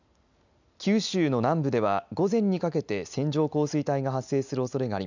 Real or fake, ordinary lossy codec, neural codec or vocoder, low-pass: real; none; none; 7.2 kHz